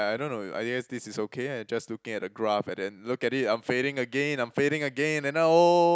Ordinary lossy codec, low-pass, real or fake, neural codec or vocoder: none; none; real; none